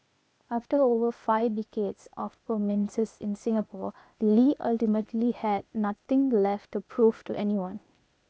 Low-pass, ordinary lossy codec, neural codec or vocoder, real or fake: none; none; codec, 16 kHz, 0.8 kbps, ZipCodec; fake